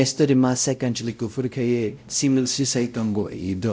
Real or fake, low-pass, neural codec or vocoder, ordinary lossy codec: fake; none; codec, 16 kHz, 0.5 kbps, X-Codec, WavLM features, trained on Multilingual LibriSpeech; none